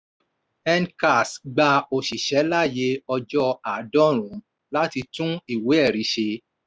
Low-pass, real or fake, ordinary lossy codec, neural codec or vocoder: none; real; none; none